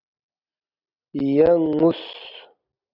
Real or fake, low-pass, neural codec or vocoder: real; 5.4 kHz; none